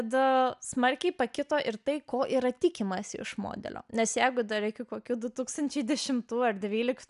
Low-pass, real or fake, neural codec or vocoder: 10.8 kHz; real; none